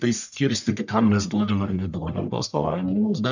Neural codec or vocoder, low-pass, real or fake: codec, 44.1 kHz, 1.7 kbps, Pupu-Codec; 7.2 kHz; fake